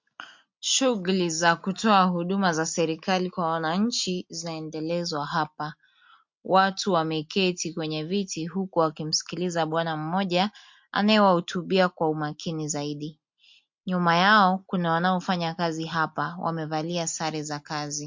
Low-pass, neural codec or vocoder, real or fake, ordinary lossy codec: 7.2 kHz; none; real; MP3, 48 kbps